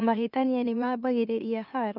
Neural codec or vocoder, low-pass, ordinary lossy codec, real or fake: autoencoder, 44.1 kHz, a latent of 192 numbers a frame, MeloTTS; 5.4 kHz; none; fake